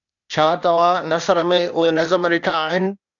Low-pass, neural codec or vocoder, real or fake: 7.2 kHz; codec, 16 kHz, 0.8 kbps, ZipCodec; fake